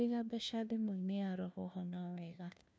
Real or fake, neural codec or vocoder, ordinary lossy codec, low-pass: fake; codec, 16 kHz, 1 kbps, FunCodec, trained on LibriTTS, 50 frames a second; none; none